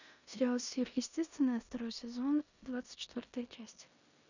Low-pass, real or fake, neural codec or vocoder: 7.2 kHz; fake; codec, 16 kHz in and 24 kHz out, 0.9 kbps, LongCat-Audio-Codec, four codebook decoder